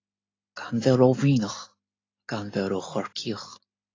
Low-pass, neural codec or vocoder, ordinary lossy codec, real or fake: 7.2 kHz; none; AAC, 32 kbps; real